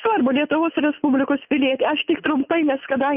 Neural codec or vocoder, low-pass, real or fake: none; 3.6 kHz; real